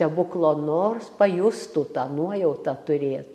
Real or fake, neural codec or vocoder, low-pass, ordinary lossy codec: real; none; 14.4 kHz; AAC, 96 kbps